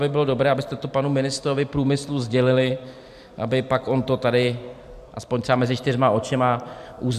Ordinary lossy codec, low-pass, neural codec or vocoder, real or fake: AAC, 96 kbps; 14.4 kHz; vocoder, 44.1 kHz, 128 mel bands every 256 samples, BigVGAN v2; fake